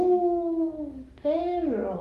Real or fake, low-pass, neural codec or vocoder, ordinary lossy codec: fake; 14.4 kHz; codec, 44.1 kHz, 7.8 kbps, DAC; Opus, 24 kbps